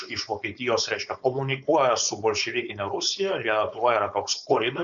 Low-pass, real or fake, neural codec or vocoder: 7.2 kHz; fake; codec, 16 kHz, 4.8 kbps, FACodec